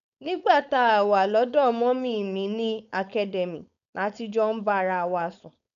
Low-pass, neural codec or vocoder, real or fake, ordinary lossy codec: 7.2 kHz; codec, 16 kHz, 4.8 kbps, FACodec; fake; none